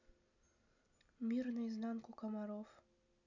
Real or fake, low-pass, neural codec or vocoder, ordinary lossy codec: real; 7.2 kHz; none; none